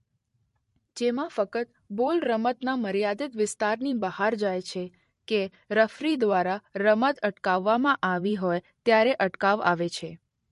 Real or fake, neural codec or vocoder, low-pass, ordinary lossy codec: fake; vocoder, 44.1 kHz, 128 mel bands every 512 samples, BigVGAN v2; 14.4 kHz; MP3, 48 kbps